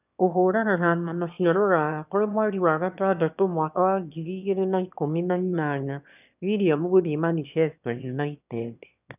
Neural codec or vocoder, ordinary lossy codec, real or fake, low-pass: autoencoder, 22.05 kHz, a latent of 192 numbers a frame, VITS, trained on one speaker; none; fake; 3.6 kHz